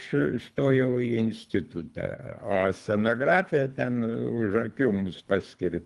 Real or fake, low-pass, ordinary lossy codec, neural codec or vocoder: fake; 10.8 kHz; Opus, 32 kbps; codec, 24 kHz, 3 kbps, HILCodec